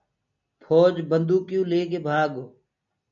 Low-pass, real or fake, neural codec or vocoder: 7.2 kHz; real; none